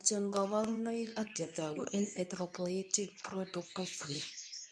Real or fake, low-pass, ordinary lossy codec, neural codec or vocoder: fake; none; none; codec, 24 kHz, 0.9 kbps, WavTokenizer, medium speech release version 2